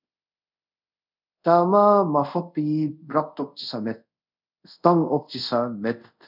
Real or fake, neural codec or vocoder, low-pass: fake; codec, 24 kHz, 0.5 kbps, DualCodec; 5.4 kHz